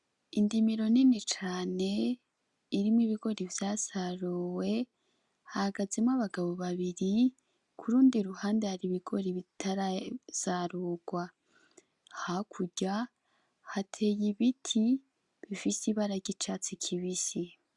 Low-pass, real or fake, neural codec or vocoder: 10.8 kHz; real; none